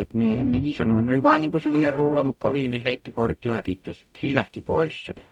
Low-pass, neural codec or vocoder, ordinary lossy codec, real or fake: 19.8 kHz; codec, 44.1 kHz, 0.9 kbps, DAC; none; fake